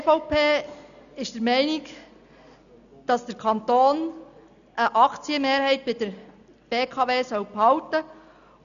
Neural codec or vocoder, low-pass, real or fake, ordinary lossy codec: none; 7.2 kHz; real; none